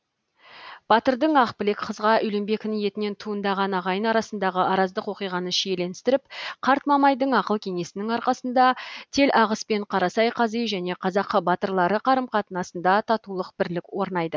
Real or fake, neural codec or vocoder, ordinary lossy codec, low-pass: real; none; none; none